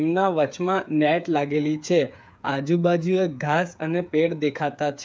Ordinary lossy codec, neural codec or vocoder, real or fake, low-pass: none; codec, 16 kHz, 8 kbps, FreqCodec, smaller model; fake; none